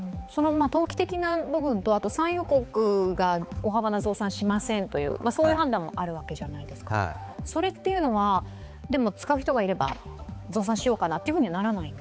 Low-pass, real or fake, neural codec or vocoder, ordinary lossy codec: none; fake; codec, 16 kHz, 4 kbps, X-Codec, HuBERT features, trained on balanced general audio; none